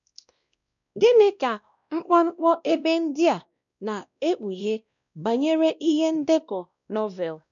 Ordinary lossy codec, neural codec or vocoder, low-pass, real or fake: none; codec, 16 kHz, 1 kbps, X-Codec, WavLM features, trained on Multilingual LibriSpeech; 7.2 kHz; fake